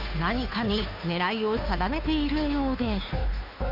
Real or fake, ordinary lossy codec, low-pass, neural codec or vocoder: fake; none; 5.4 kHz; codec, 16 kHz, 2 kbps, FunCodec, trained on Chinese and English, 25 frames a second